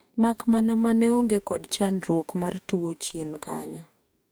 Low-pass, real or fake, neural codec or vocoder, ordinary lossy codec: none; fake; codec, 44.1 kHz, 2.6 kbps, DAC; none